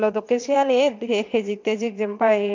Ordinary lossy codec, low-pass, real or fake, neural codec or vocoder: MP3, 64 kbps; 7.2 kHz; fake; vocoder, 22.05 kHz, 80 mel bands, WaveNeXt